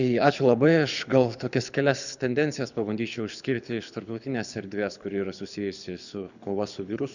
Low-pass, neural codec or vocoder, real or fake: 7.2 kHz; codec, 24 kHz, 6 kbps, HILCodec; fake